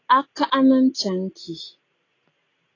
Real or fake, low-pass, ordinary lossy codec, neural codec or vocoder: real; 7.2 kHz; AAC, 32 kbps; none